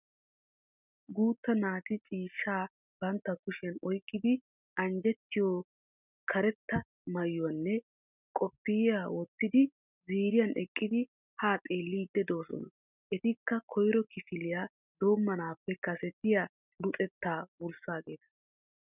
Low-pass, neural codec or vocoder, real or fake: 3.6 kHz; none; real